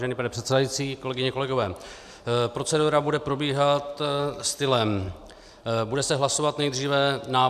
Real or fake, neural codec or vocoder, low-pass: real; none; 14.4 kHz